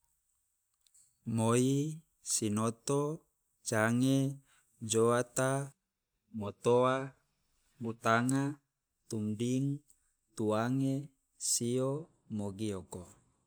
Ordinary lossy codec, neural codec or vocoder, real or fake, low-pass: none; none; real; none